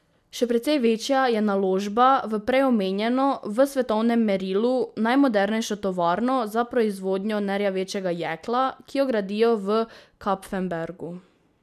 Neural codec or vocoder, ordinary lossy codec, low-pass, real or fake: none; none; 14.4 kHz; real